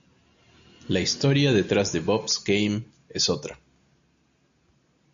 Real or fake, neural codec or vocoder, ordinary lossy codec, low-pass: real; none; MP3, 64 kbps; 7.2 kHz